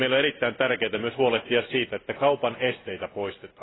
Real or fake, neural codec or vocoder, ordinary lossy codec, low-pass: real; none; AAC, 16 kbps; 7.2 kHz